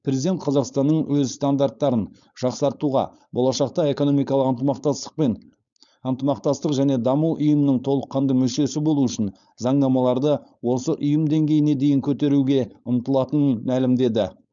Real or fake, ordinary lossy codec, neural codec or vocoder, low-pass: fake; none; codec, 16 kHz, 4.8 kbps, FACodec; 7.2 kHz